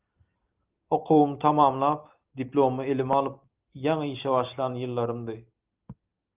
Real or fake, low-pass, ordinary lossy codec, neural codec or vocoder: real; 3.6 kHz; Opus, 32 kbps; none